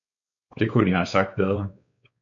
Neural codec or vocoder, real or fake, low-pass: codec, 16 kHz, 4 kbps, X-Codec, WavLM features, trained on Multilingual LibriSpeech; fake; 7.2 kHz